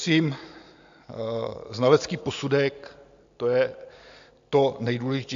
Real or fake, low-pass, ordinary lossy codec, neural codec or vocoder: real; 7.2 kHz; AAC, 64 kbps; none